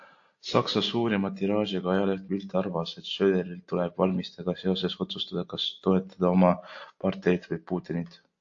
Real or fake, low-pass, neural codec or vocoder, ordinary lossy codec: real; 7.2 kHz; none; AAC, 48 kbps